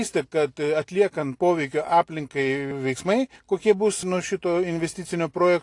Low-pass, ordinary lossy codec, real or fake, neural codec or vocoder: 10.8 kHz; AAC, 48 kbps; real; none